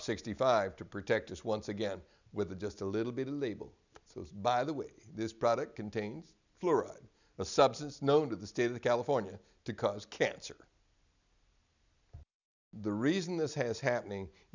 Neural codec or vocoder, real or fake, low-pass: none; real; 7.2 kHz